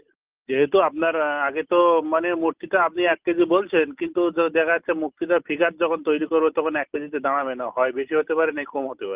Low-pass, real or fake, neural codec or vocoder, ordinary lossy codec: 3.6 kHz; real; none; Opus, 32 kbps